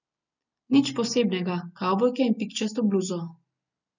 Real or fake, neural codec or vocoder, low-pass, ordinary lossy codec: real; none; 7.2 kHz; none